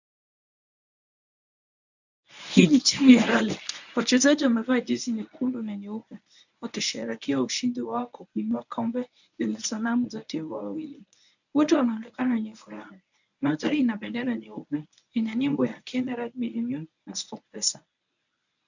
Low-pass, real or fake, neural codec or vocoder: 7.2 kHz; fake; codec, 24 kHz, 0.9 kbps, WavTokenizer, medium speech release version 1